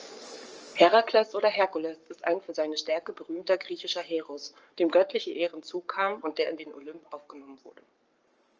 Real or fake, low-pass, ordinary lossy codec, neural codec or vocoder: fake; 7.2 kHz; Opus, 24 kbps; codec, 44.1 kHz, 7.8 kbps, Pupu-Codec